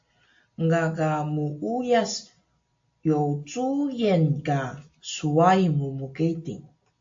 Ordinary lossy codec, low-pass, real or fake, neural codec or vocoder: AAC, 48 kbps; 7.2 kHz; real; none